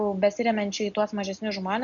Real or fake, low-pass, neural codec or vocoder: real; 7.2 kHz; none